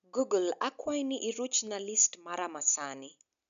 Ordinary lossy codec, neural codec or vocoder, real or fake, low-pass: none; none; real; 7.2 kHz